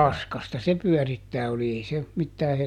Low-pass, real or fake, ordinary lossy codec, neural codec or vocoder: 19.8 kHz; real; none; none